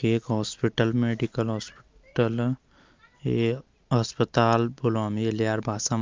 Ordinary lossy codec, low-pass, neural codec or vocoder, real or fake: Opus, 24 kbps; 7.2 kHz; none; real